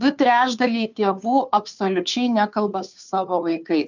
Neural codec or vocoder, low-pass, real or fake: codec, 16 kHz, 2 kbps, FunCodec, trained on Chinese and English, 25 frames a second; 7.2 kHz; fake